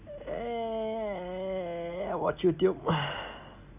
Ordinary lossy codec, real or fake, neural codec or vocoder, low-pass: none; real; none; 3.6 kHz